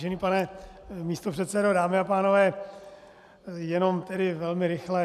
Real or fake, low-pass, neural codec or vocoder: real; 14.4 kHz; none